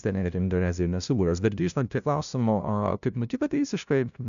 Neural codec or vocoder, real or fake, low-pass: codec, 16 kHz, 0.5 kbps, FunCodec, trained on LibriTTS, 25 frames a second; fake; 7.2 kHz